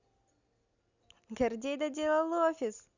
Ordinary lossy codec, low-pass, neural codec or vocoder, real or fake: Opus, 64 kbps; 7.2 kHz; none; real